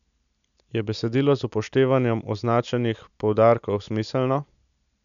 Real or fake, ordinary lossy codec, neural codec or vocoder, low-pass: real; none; none; 7.2 kHz